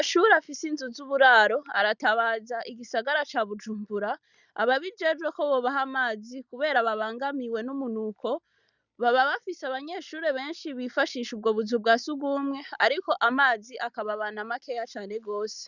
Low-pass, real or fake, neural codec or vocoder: 7.2 kHz; real; none